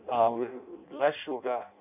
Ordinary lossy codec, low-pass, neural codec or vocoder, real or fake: none; 3.6 kHz; codec, 16 kHz in and 24 kHz out, 0.6 kbps, FireRedTTS-2 codec; fake